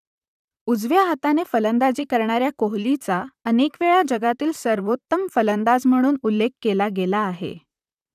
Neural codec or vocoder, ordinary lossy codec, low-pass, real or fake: vocoder, 44.1 kHz, 128 mel bands, Pupu-Vocoder; none; 14.4 kHz; fake